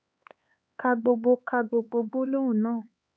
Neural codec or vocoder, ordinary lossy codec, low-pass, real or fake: codec, 16 kHz, 4 kbps, X-Codec, HuBERT features, trained on LibriSpeech; none; none; fake